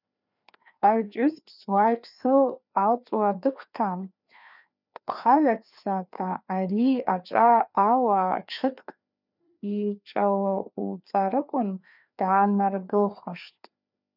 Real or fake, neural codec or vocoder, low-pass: fake; codec, 16 kHz, 2 kbps, FreqCodec, larger model; 5.4 kHz